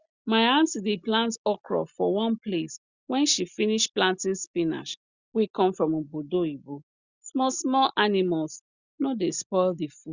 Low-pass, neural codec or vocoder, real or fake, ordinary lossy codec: 7.2 kHz; none; real; Opus, 64 kbps